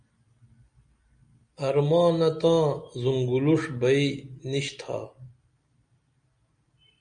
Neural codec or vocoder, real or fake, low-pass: none; real; 9.9 kHz